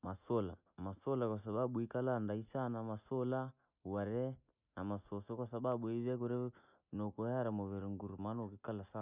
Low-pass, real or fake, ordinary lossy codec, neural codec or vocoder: 3.6 kHz; real; none; none